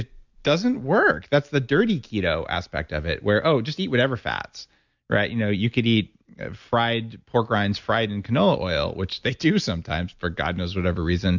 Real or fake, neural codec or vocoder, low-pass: real; none; 7.2 kHz